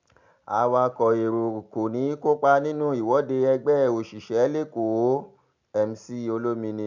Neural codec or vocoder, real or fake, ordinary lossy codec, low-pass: none; real; MP3, 64 kbps; 7.2 kHz